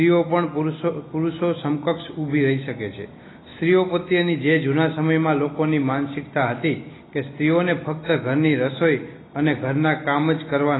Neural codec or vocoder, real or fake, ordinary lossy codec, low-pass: none; real; AAC, 16 kbps; 7.2 kHz